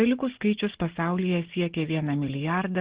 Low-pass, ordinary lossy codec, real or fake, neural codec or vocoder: 3.6 kHz; Opus, 16 kbps; real; none